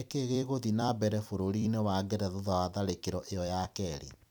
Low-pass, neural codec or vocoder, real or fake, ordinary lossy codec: none; vocoder, 44.1 kHz, 128 mel bands every 256 samples, BigVGAN v2; fake; none